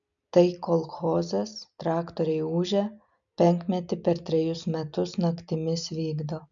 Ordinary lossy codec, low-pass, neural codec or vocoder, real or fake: MP3, 96 kbps; 7.2 kHz; none; real